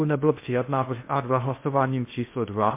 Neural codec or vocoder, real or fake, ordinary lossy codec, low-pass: codec, 16 kHz in and 24 kHz out, 0.6 kbps, FocalCodec, streaming, 2048 codes; fake; MP3, 24 kbps; 3.6 kHz